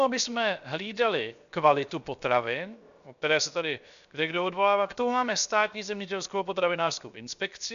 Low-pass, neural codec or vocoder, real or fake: 7.2 kHz; codec, 16 kHz, 0.7 kbps, FocalCodec; fake